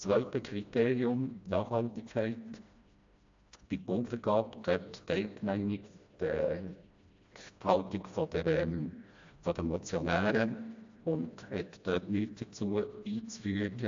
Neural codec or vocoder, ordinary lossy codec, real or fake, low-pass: codec, 16 kHz, 1 kbps, FreqCodec, smaller model; AAC, 64 kbps; fake; 7.2 kHz